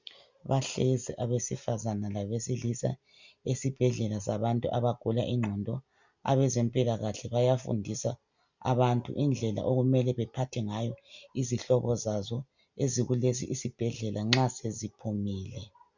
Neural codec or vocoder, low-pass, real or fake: none; 7.2 kHz; real